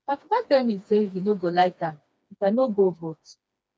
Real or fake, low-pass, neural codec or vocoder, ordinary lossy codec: fake; none; codec, 16 kHz, 2 kbps, FreqCodec, smaller model; none